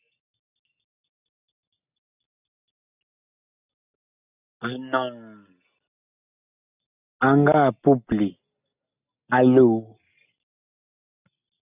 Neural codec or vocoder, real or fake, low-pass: none; real; 3.6 kHz